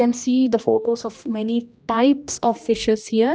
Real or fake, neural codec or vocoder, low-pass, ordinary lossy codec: fake; codec, 16 kHz, 1 kbps, X-Codec, HuBERT features, trained on general audio; none; none